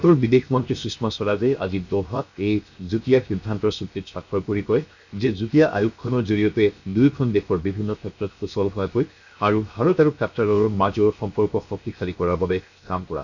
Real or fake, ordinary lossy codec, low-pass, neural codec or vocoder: fake; none; 7.2 kHz; codec, 16 kHz, 0.7 kbps, FocalCodec